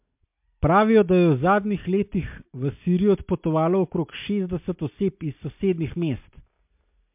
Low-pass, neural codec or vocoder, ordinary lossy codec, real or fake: 3.6 kHz; none; MP3, 32 kbps; real